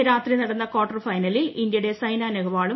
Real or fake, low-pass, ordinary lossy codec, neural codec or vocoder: real; 7.2 kHz; MP3, 24 kbps; none